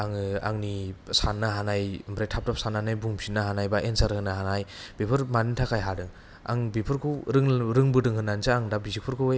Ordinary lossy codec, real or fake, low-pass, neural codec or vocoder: none; real; none; none